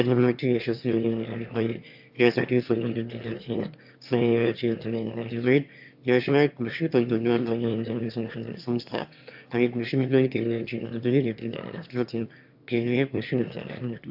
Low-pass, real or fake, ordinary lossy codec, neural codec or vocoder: 5.4 kHz; fake; AAC, 48 kbps; autoencoder, 22.05 kHz, a latent of 192 numbers a frame, VITS, trained on one speaker